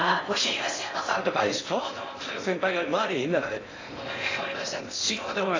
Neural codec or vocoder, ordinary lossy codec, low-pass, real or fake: codec, 16 kHz in and 24 kHz out, 0.6 kbps, FocalCodec, streaming, 4096 codes; AAC, 32 kbps; 7.2 kHz; fake